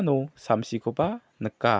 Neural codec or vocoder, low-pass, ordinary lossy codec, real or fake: none; none; none; real